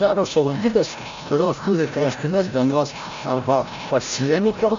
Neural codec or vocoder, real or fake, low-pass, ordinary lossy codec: codec, 16 kHz, 0.5 kbps, FreqCodec, larger model; fake; 7.2 kHz; AAC, 64 kbps